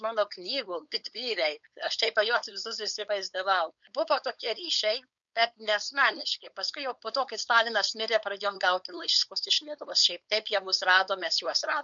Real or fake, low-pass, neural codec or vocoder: fake; 7.2 kHz; codec, 16 kHz, 4.8 kbps, FACodec